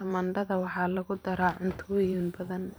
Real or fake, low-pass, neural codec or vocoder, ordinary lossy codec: fake; none; vocoder, 44.1 kHz, 128 mel bands every 512 samples, BigVGAN v2; none